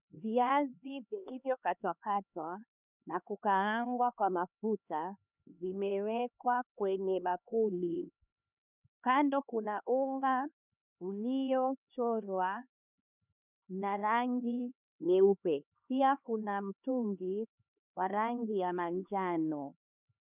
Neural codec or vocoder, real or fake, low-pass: codec, 16 kHz, 2 kbps, X-Codec, HuBERT features, trained on LibriSpeech; fake; 3.6 kHz